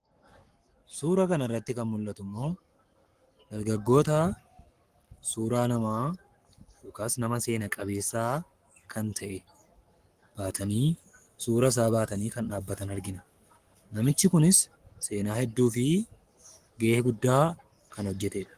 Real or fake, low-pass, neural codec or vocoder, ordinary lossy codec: fake; 14.4 kHz; codec, 44.1 kHz, 7.8 kbps, Pupu-Codec; Opus, 24 kbps